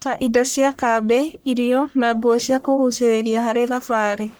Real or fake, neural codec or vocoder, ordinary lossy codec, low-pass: fake; codec, 44.1 kHz, 1.7 kbps, Pupu-Codec; none; none